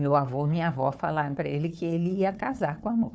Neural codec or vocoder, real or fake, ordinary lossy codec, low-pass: codec, 16 kHz, 4 kbps, FunCodec, trained on Chinese and English, 50 frames a second; fake; none; none